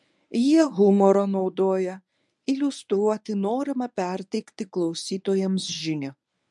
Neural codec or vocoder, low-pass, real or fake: codec, 24 kHz, 0.9 kbps, WavTokenizer, medium speech release version 1; 10.8 kHz; fake